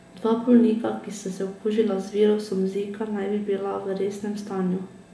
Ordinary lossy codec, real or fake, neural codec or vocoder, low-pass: none; real; none; none